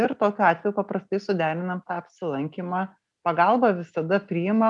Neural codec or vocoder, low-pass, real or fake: none; 10.8 kHz; real